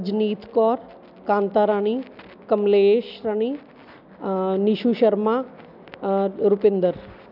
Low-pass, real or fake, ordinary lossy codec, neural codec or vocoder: 5.4 kHz; real; none; none